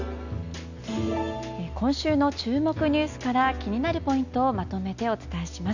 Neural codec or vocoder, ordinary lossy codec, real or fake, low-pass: none; none; real; 7.2 kHz